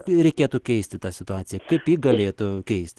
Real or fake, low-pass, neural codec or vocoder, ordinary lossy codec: real; 10.8 kHz; none; Opus, 16 kbps